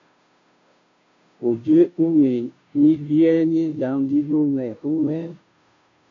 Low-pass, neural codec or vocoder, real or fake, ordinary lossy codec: 7.2 kHz; codec, 16 kHz, 0.5 kbps, FunCodec, trained on Chinese and English, 25 frames a second; fake; MP3, 64 kbps